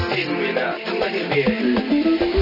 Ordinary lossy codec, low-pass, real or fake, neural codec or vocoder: AAC, 32 kbps; 5.4 kHz; real; none